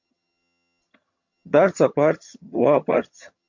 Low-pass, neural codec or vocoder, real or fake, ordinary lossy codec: 7.2 kHz; vocoder, 22.05 kHz, 80 mel bands, HiFi-GAN; fake; MP3, 48 kbps